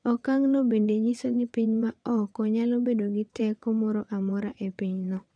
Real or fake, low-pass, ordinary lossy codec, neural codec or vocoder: fake; 9.9 kHz; none; vocoder, 22.05 kHz, 80 mel bands, WaveNeXt